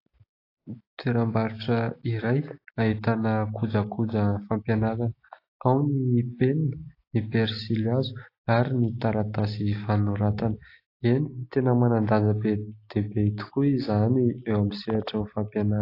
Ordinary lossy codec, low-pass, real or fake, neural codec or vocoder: AAC, 32 kbps; 5.4 kHz; real; none